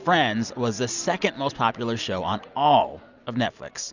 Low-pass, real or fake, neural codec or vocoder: 7.2 kHz; real; none